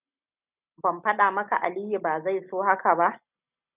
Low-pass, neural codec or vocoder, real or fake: 3.6 kHz; none; real